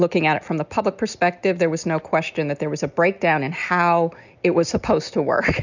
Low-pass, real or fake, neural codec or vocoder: 7.2 kHz; real; none